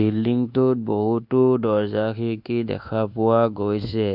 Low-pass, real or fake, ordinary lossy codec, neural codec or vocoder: 5.4 kHz; fake; none; codec, 24 kHz, 1.2 kbps, DualCodec